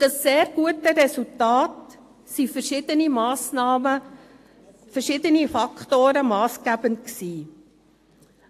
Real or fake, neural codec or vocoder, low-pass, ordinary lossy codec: real; none; 14.4 kHz; AAC, 64 kbps